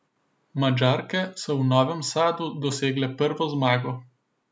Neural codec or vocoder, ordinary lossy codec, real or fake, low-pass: none; none; real; none